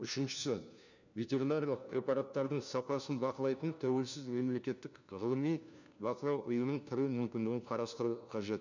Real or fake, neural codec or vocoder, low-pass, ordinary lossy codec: fake; codec, 16 kHz, 1 kbps, FunCodec, trained on LibriTTS, 50 frames a second; 7.2 kHz; none